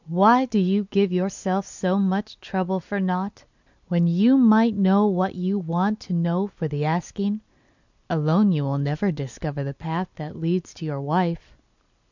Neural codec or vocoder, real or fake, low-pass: none; real; 7.2 kHz